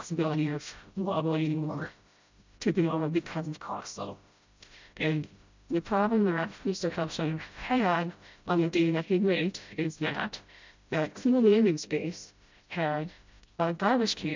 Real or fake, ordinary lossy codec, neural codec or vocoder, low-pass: fake; MP3, 64 kbps; codec, 16 kHz, 0.5 kbps, FreqCodec, smaller model; 7.2 kHz